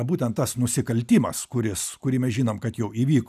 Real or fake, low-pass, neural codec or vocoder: real; 14.4 kHz; none